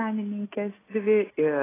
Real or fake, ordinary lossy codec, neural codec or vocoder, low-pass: real; AAC, 16 kbps; none; 3.6 kHz